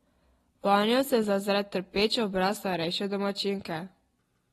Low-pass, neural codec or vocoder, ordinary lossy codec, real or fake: 14.4 kHz; none; AAC, 32 kbps; real